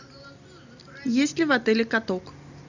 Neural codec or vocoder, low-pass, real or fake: none; 7.2 kHz; real